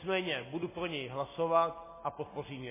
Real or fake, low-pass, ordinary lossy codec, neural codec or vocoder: real; 3.6 kHz; MP3, 16 kbps; none